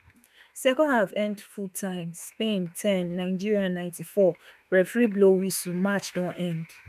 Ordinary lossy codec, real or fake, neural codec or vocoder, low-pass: none; fake; autoencoder, 48 kHz, 32 numbers a frame, DAC-VAE, trained on Japanese speech; 14.4 kHz